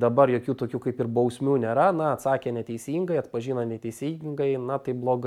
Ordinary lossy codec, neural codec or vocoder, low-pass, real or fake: MP3, 96 kbps; none; 19.8 kHz; real